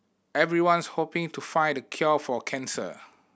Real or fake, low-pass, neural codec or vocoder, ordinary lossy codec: real; none; none; none